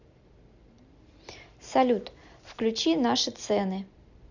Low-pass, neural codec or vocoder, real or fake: 7.2 kHz; none; real